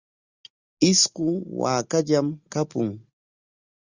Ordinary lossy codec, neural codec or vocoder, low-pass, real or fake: Opus, 64 kbps; none; 7.2 kHz; real